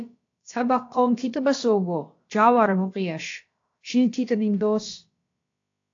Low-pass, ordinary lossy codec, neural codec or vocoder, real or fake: 7.2 kHz; AAC, 48 kbps; codec, 16 kHz, about 1 kbps, DyCAST, with the encoder's durations; fake